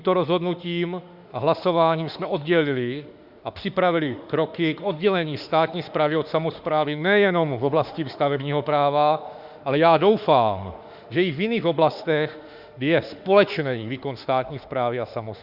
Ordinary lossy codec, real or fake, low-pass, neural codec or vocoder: Opus, 64 kbps; fake; 5.4 kHz; autoencoder, 48 kHz, 32 numbers a frame, DAC-VAE, trained on Japanese speech